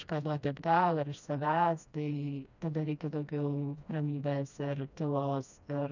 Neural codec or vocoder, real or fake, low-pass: codec, 16 kHz, 1 kbps, FreqCodec, smaller model; fake; 7.2 kHz